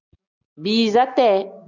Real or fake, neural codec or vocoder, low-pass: real; none; 7.2 kHz